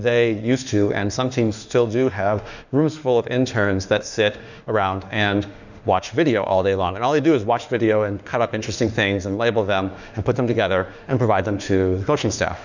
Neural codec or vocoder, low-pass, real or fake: autoencoder, 48 kHz, 32 numbers a frame, DAC-VAE, trained on Japanese speech; 7.2 kHz; fake